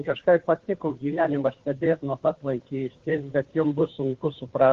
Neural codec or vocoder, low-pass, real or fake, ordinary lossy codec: codec, 16 kHz, 2 kbps, FunCodec, trained on Chinese and English, 25 frames a second; 7.2 kHz; fake; Opus, 16 kbps